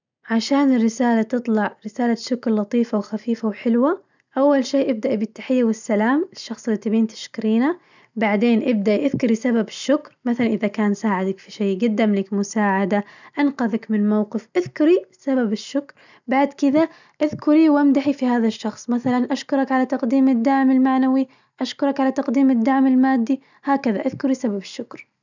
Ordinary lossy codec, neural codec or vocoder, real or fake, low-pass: none; none; real; 7.2 kHz